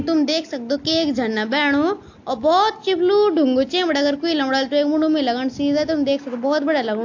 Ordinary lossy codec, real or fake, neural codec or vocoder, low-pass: AAC, 48 kbps; real; none; 7.2 kHz